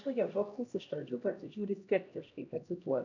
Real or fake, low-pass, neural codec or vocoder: fake; 7.2 kHz; codec, 16 kHz, 1 kbps, X-Codec, HuBERT features, trained on LibriSpeech